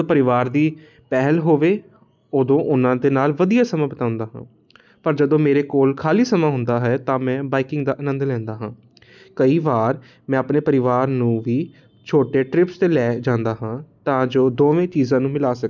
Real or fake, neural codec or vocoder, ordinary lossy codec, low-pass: real; none; none; 7.2 kHz